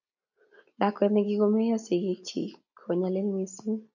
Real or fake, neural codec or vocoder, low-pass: real; none; 7.2 kHz